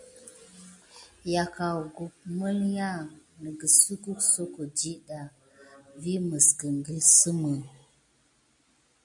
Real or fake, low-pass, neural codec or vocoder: real; 10.8 kHz; none